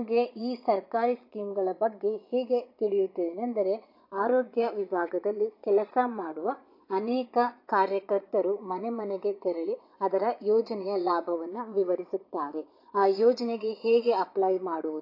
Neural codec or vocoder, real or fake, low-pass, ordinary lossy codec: vocoder, 22.05 kHz, 80 mel bands, Vocos; fake; 5.4 kHz; AAC, 32 kbps